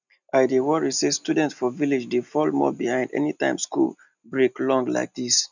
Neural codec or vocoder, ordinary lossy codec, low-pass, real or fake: none; none; 7.2 kHz; real